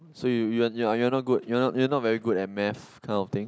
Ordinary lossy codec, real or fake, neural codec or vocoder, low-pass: none; real; none; none